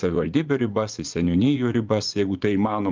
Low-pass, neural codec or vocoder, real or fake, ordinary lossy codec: 7.2 kHz; vocoder, 24 kHz, 100 mel bands, Vocos; fake; Opus, 24 kbps